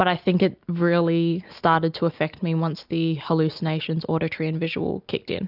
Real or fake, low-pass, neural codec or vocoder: real; 5.4 kHz; none